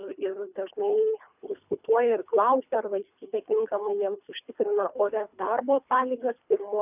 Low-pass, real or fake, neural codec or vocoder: 3.6 kHz; fake; codec, 24 kHz, 3 kbps, HILCodec